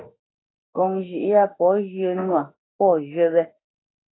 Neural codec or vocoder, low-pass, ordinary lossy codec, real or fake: autoencoder, 48 kHz, 32 numbers a frame, DAC-VAE, trained on Japanese speech; 7.2 kHz; AAC, 16 kbps; fake